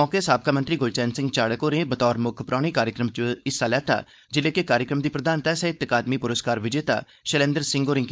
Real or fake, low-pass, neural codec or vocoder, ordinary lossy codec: fake; none; codec, 16 kHz, 4.8 kbps, FACodec; none